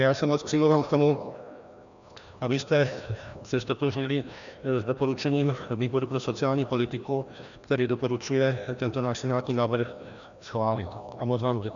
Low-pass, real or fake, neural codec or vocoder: 7.2 kHz; fake; codec, 16 kHz, 1 kbps, FreqCodec, larger model